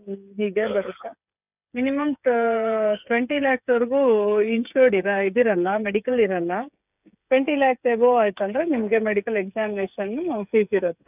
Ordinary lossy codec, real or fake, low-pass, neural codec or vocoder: none; fake; 3.6 kHz; codec, 16 kHz, 8 kbps, FreqCodec, smaller model